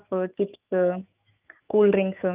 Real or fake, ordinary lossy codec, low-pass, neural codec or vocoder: fake; Opus, 24 kbps; 3.6 kHz; autoencoder, 48 kHz, 128 numbers a frame, DAC-VAE, trained on Japanese speech